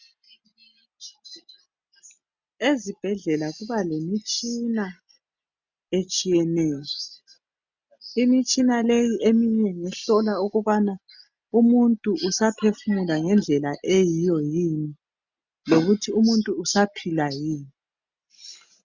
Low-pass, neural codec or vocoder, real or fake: 7.2 kHz; none; real